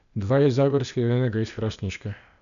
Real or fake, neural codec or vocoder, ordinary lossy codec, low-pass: fake; codec, 16 kHz, 0.8 kbps, ZipCodec; none; 7.2 kHz